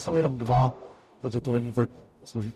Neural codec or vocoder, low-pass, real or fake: codec, 44.1 kHz, 0.9 kbps, DAC; 14.4 kHz; fake